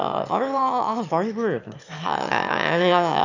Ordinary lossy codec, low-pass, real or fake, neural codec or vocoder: none; 7.2 kHz; fake; autoencoder, 22.05 kHz, a latent of 192 numbers a frame, VITS, trained on one speaker